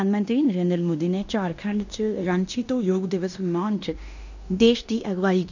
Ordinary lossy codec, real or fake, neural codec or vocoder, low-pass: none; fake; codec, 16 kHz in and 24 kHz out, 0.9 kbps, LongCat-Audio-Codec, fine tuned four codebook decoder; 7.2 kHz